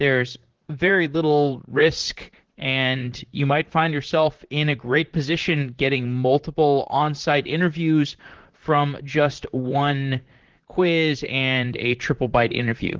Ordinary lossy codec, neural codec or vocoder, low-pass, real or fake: Opus, 16 kbps; vocoder, 44.1 kHz, 128 mel bands, Pupu-Vocoder; 7.2 kHz; fake